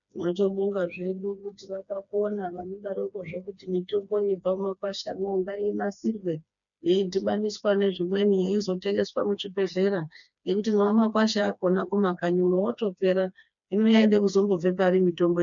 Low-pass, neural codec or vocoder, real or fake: 7.2 kHz; codec, 16 kHz, 2 kbps, FreqCodec, smaller model; fake